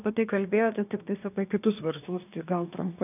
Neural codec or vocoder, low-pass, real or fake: codec, 24 kHz, 1 kbps, SNAC; 3.6 kHz; fake